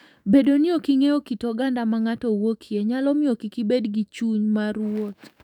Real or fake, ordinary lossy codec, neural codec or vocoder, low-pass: fake; none; autoencoder, 48 kHz, 128 numbers a frame, DAC-VAE, trained on Japanese speech; 19.8 kHz